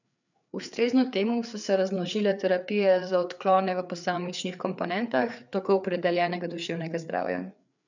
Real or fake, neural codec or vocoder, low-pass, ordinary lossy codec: fake; codec, 16 kHz, 4 kbps, FreqCodec, larger model; 7.2 kHz; none